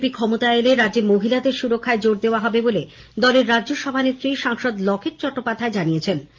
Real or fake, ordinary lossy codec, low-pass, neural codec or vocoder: real; Opus, 24 kbps; 7.2 kHz; none